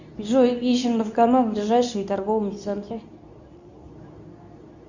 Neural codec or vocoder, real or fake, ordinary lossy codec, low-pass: codec, 24 kHz, 0.9 kbps, WavTokenizer, medium speech release version 2; fake; Opus, 64 kbps; 7.2 kHz